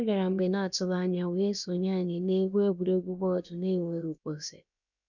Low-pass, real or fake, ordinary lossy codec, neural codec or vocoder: 7.2 kHz; fake; none; codec, 16 kHz, about 1 kbps, DyCAST, with the encoder's durations